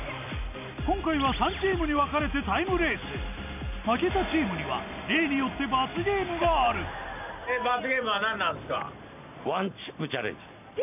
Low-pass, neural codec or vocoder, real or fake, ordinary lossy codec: 3.6 kHz; none; real; none